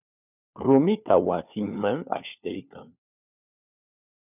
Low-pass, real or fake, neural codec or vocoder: 3.6 kHz; fake; codec, 16 kHz, 4 kbps, FunCodec, trained on LibriTTS, 50 frames a second